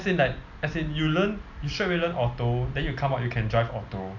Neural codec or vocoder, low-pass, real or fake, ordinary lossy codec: none; 7.2 kHz; real; none